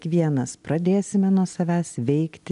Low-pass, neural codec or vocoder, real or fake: 10.8 kHz; none; real